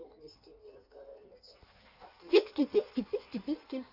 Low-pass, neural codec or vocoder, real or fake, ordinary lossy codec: 5.4 kHz; codec, 16 kHz in and 24 kHz out, 1.1 kbps, FireRedTTS-2 codec; fake; none